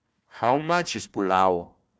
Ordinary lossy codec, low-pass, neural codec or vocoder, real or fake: none; none; codec, 16 kHz, 1 kbps, FunCodec, trained on Chinese and English, 50 frames a second; fake